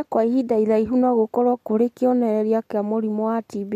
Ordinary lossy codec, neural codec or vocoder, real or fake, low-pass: MP3, 64 kbps; none; real; 14.4 kHz